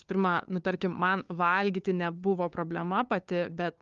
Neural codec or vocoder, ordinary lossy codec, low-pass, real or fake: codec, 16 kHz, 2 kbps, FunCodec, trained on LibriTTS, 25 frames a second; Opus, 32 kbps; 7.2 kHz; fake